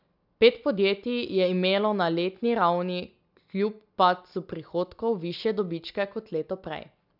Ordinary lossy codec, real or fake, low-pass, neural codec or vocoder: none; real; 5.4 kHz; none